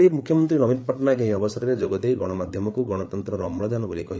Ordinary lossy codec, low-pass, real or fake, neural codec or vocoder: none; none; fake; codec, 16 kHz, 8 kbps, FreqCodec, larger model